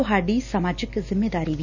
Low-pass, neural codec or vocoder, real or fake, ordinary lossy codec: 7.2 kHz; none; real; none